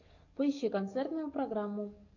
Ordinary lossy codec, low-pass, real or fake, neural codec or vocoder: MP3, 48 kbps; 7.2 kHz; fake; codec, 44.1 kHz, 7.8 kbps, DAC